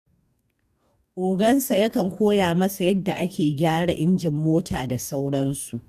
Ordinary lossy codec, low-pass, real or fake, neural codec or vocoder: none; 14.4 kHz; fake; codec, 44.1 kHz, 2.6 kbps, DAC